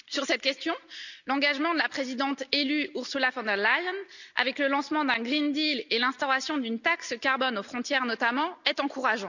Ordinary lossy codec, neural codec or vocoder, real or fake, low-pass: none; none; real; 7.2 kHz